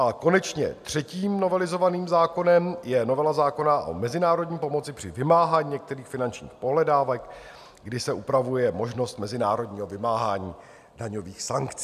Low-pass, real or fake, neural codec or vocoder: 14.4 kHz; real; none